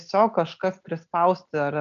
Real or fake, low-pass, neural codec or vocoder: real; 7.2 kHz; none